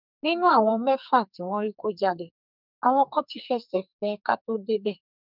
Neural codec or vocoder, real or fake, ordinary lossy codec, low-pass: codec, 44.1 kHz, 2.6 kbps, SNAC; fake; none; 5.4 kHz